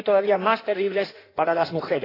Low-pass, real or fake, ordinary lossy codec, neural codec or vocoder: 5.4 kHz; fake; AAC, 24 kbps; codec, 16 kHz in and 24 kHz out, 1.1 kbps, FireRedTTS-2 codec